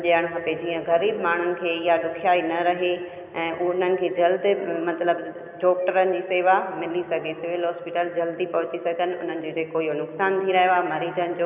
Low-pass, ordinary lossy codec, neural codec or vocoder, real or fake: 3.6 kHz; none; none; real